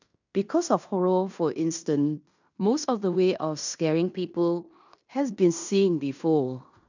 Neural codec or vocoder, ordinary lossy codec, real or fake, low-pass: codec, 16 kHz in and 24 kHz out, 0.9 kbps, LongCat-Audio-Codec, fine tuned four codebook decoder; none; fake; 7.2 kHz